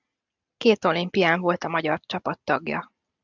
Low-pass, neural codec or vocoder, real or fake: 7.2 kHz; none; real